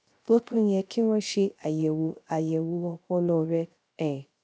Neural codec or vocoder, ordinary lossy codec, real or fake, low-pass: codec, 16 kHz, 0.3 kbps, FocalCodec; none; fake; none